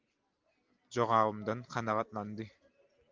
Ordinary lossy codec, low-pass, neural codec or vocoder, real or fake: Opus, 24 kbps; 7.2 kHz; none; real